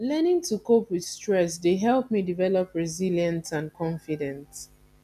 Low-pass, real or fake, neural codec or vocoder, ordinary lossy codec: 14.4 kHz; real; none; none